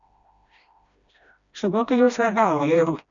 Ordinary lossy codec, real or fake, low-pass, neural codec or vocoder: none; fake; 7.2 kHz; codec, 16 kHz, 1 kbps, FreqCodec, smaller model